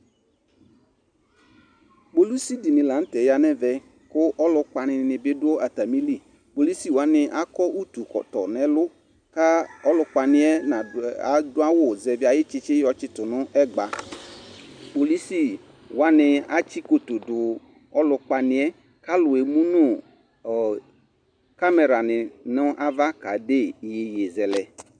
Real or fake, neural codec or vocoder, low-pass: real; none; 9.9 kHz